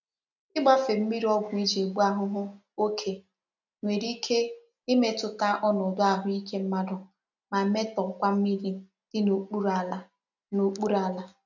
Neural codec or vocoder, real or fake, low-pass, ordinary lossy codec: none; real; 7.2 kHz; none